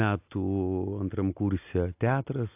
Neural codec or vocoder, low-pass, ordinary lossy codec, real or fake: none; 3.6 kHz; AAC, 24 kbps; real